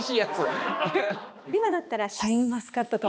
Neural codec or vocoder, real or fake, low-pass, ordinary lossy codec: codec, 16 kHz, 2 kbps, X-Codec, HuBERT features, trained on balanced general audio; fake; none; none